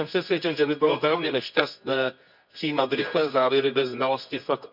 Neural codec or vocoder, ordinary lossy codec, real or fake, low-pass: codec, 24 kHz, 0.9 kbps, WavTokenizer, medium music audio release; none; fake; 5.4 kHz